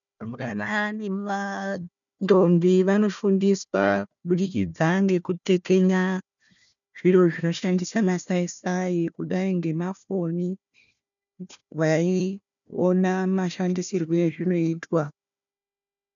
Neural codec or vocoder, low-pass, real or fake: codec, 16 kHz, 1 kbps, FunCodec, trained on Chinese and English, 50 frames a second; 7.2 kHz; fake